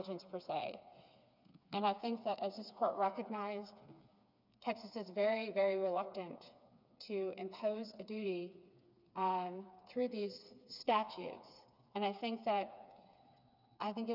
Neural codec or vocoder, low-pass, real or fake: codec, 16 kHz, 4 kbps, FreqCodec, smaller model; 5.4 kHz; fake